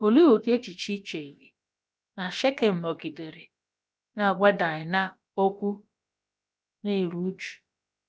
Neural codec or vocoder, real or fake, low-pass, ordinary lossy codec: codec, 16 kHz, about 1 kbps, DyCAST, with the encoder's durations; fake; none; none